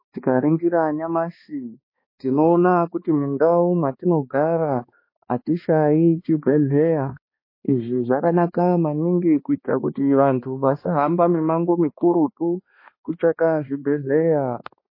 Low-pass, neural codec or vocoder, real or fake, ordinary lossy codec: 5.4 kHz; codec, 16 kHz, 2 kbps, X-Codec, HuBERT features, trained on balanced general audio; fake; MP3, 24 kbps